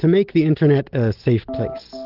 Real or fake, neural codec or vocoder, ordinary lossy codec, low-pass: real; none; Opus, 16 kbps; 5.4 kHz